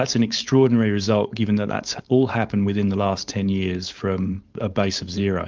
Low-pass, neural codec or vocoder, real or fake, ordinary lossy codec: 7.2 kHz; codec, 16 kHz, 4.8 kbps, FACodec; fake; Opus, 32 kbps